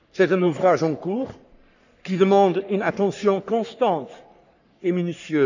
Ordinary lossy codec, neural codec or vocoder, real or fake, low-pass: none; codec, 44.1 kHz, 3.4 kbps, Pupu-Codec; fake; 7.2 kHz